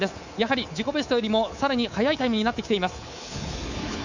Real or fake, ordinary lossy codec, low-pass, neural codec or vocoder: fake; Opus, 64 kbps; 7.2 kHz; codec, 24 kHz, 3.1 kbps, DualCodec